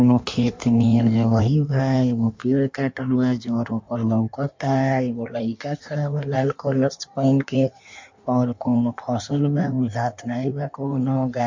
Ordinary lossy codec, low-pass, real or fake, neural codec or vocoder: MP3, 48 kbps; 7.2 kHz; fake; codec, 16 kHz in and 24 kHz out, 1.1 kbps, FireRedTTS-2 codec